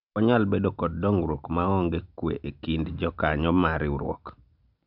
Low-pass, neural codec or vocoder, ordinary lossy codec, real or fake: 5.4 kHz; none; none; real